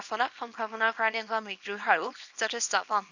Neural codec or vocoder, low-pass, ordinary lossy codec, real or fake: codec, 24 kHz, 0.9 kbps, WavTokenizer, small release; 7.2 kHz; none; fake